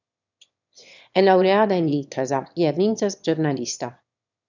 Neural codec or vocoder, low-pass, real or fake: autoencoder, 22.05 kHz, a latent of 192 numbers a frame, VITS, trained on one speaker; 7.2 kHz; fake